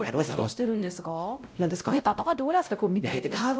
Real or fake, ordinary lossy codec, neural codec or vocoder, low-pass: fake; none; codec, 16 kHz, 0.5 kbps, X-Codec, WavLM features, trained on Multilingual LibriSpeech; none